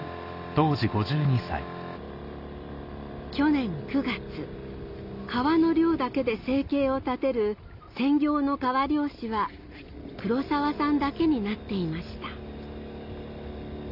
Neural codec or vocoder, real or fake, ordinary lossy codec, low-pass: none; real; none; 5.4 kHz